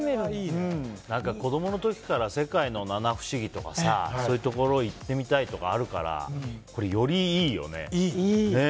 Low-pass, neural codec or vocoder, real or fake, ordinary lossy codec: none; none; real; none